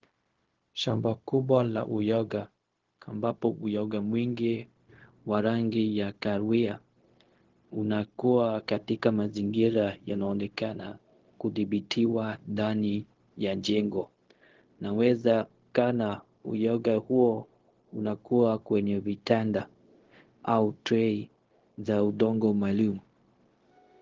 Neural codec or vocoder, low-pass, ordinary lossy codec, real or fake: codec, 16 kHz, 0.4 kbps, LongCat-Audio-Codec; 7.2 kHz; Opus, 16 kbps; fake